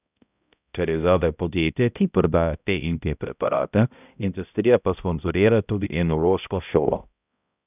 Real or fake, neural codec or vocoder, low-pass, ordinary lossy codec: fake; codec, 16 kHz, 0.5 kbps, X-Codec, HuBERT features, trained on balanced general audio; 3.6 kHz; none